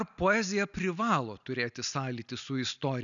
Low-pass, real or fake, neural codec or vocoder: 7.2 kHz; real; none